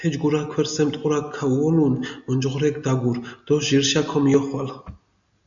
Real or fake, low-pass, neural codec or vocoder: real; 7.2 kHz; none